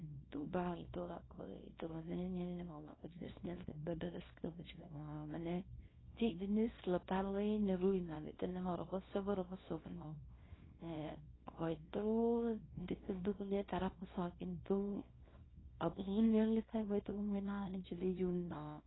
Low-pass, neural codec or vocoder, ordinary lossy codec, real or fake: 7.2 kHz; codec, 24 kHz, 0.9 kbps, WavTokenizer, small release; AAC, 16 kbps; fake